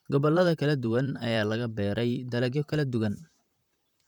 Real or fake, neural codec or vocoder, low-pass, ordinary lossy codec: fake; vocoder, 44.1 kHz, 128 mel bands, Pupu-Vocoder; 19.8 kHz; none